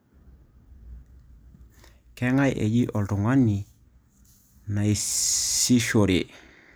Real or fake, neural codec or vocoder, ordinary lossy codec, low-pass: real; none; none; none